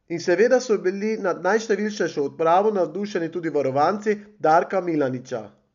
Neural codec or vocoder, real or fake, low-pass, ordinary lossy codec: none; real; 7.2 kHz; none